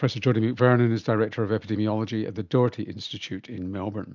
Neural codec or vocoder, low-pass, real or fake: none; 7.2 kHz; real